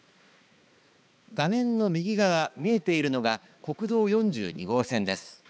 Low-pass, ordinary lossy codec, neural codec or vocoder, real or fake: none; none; codec, 16 kHz, 2 kbps, X-Codec, HuBERT features, trained on balanced general audio; fake